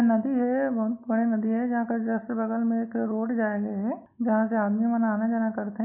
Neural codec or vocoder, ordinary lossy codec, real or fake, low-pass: none; MP3, 32 kbps; real; 3.6 kHz